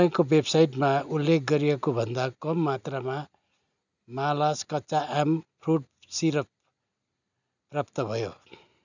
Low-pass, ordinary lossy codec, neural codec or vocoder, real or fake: 7.2 kHz; none; none; real